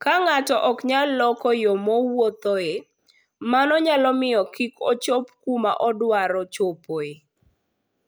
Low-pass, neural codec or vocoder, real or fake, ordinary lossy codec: none; none; real; none